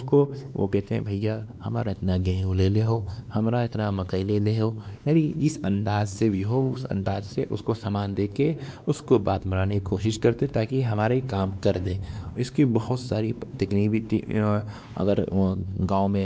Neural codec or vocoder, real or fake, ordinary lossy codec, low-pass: codec, 16 kHz, 2 kbps, X-Codec, WavLM features, trained on Multilingual LibriSpeech; fake; none; none